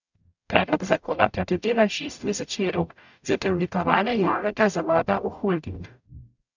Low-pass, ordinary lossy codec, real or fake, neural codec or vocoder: 7.2 kHz; none; fake; codec, 44.1 kHz, 0.9 kbps, DAC